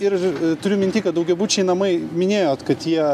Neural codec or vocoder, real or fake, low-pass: none; real; 14.4 kHz